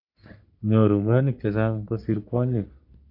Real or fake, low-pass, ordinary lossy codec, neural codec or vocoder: fake; 5.4 kHz; AAC, 48 kbps; codec, 44.1 kHz, 3.4 kbps, Pupu-Codec